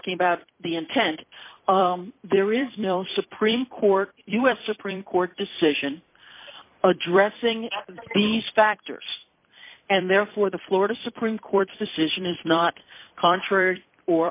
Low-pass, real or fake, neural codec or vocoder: 3.6 kHz; fake; vocoder, 44.1 kHz, 128 mel bands every 256 samples, BigVGAN v2